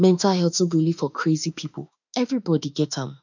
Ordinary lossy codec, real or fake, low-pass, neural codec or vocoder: none; fake; 7.2 kHz; autoencoder, 48 kHz, 32 numbers a frame, DAC-VAE, trained on Japanese speech